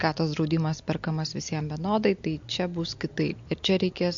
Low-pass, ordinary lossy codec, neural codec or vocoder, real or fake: 7.2 kHz; MP3, 48 kbps; none; real